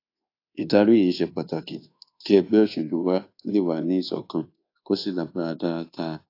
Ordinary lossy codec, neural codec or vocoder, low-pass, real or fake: AAC, 32 kbps; codec, 24 kHz, 1.2 kbps, DualCodec; 5.4 kHz; fake